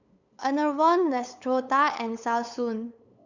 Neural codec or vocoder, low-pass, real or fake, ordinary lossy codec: codec, 16 kHz, 8 kbps, FunCodec, trained on LibriTTS, 25 frames a second; 7.2 kHz; fake; none